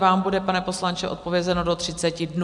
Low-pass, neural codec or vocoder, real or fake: 10.8 kHz; none; real